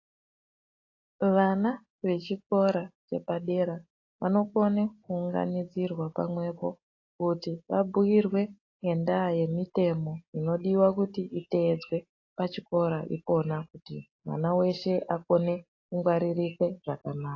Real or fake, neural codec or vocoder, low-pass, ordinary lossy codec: real; none; 7.2 kHz; AAC, 32 kbps